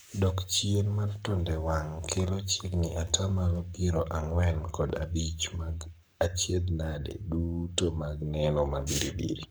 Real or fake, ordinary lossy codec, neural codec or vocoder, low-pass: fake; none; codec, 44.1 kHz, 7.8 kbps, Pupu-Codec; none